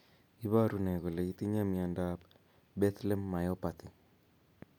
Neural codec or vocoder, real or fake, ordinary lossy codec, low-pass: none; real; none; none